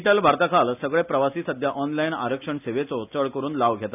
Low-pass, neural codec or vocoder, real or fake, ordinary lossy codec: 3.6 kHz; none; real; none